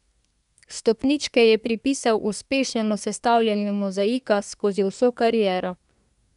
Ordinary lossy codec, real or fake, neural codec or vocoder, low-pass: none; fake; codec, 24 kHz, 1 kbps, SNAC; 10.8 kHz